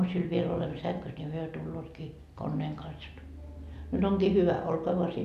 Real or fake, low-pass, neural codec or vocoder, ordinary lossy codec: real; 14.4 kHz; none; none